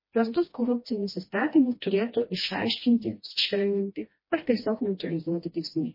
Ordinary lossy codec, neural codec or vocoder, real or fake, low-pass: MP3, 24 kbps; codec, 16 kHz, 1 kbps, FreqCodec, smaller model; fake; 5.4 kHz